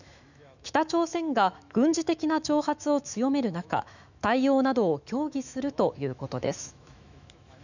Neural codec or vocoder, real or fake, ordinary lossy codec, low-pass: autoencoder, 48 kHz, 128 numbers a frame, DAC-VAE, trained on Japanese speech; fake; none; 7.2 kHz